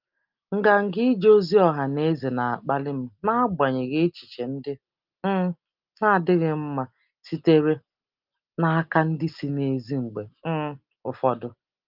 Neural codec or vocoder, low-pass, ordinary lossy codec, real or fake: none; 5.4 kHz; Opus, 24 kbps; real